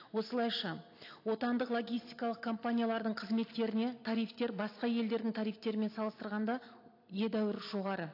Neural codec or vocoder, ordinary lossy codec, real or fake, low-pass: none; AAC, 32 kbps; real; 5.4 kHz